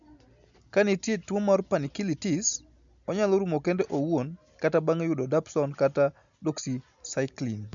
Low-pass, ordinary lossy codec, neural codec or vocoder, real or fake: 7.2 kHz; none; none; real